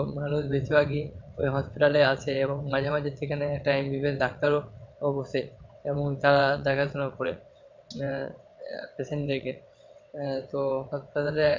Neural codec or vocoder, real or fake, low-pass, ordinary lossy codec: vocoder, 22.05 kHz, 80 mel bands, WaveNeXt; fake; 7.2 kHz; MP3, 64 kbps